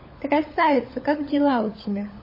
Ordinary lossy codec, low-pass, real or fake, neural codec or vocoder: MP3, 24 kbps; 5.4 kHz; fake; codec, 16 kHz, 16 kbps, FunCodec, trained on LibriTTS, 50 frames a second